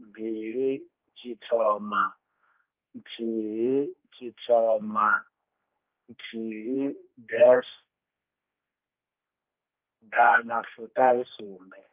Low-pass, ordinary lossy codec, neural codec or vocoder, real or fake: 3.6 kHz; Opus, 24 kbps; codec, 16 kHz, 2 kbps, X-Codec, HuBERT features, trained on general audio; fake